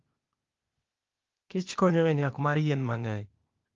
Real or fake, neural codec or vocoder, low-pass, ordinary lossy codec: fake; codec, 16 kHz, 0.8 kbps, ZipCodec; 7.2 kHz; Opus, 32 kbps